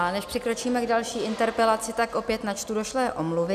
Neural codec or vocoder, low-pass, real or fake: none; 14.4 kHz; real